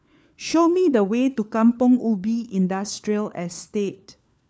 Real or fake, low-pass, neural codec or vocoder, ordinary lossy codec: fake; none; codec, 16 kHz, 4 kbps, FunCodec, trained on LibriTTS, 50 frames a second; none